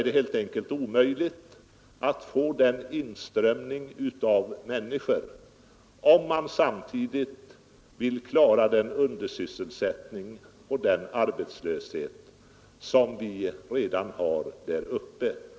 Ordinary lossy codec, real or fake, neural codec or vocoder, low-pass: none; real; none; none